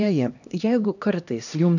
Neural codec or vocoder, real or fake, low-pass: codec, 16 kHz, 1 kbps, X-Codec, HuBERT features, trained on LibriSpeech; fake; 7.2 kHz